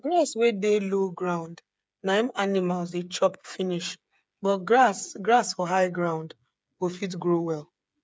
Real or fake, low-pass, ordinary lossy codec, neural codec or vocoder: fake; none; none; codec, 16 kHz, 8 kbps, FreqCodec, smaller model